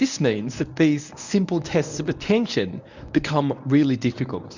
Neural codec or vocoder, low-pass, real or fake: codec, 24 kHz, 0.9 kbps, WavTokenizer, medium speech release version 1; 7.2 kHz; fake